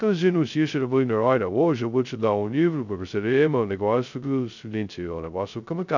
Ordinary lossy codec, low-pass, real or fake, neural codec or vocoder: none; 7.2 kHz; fake; codec, 16 kHz, 0.2 kbps, FocalCodec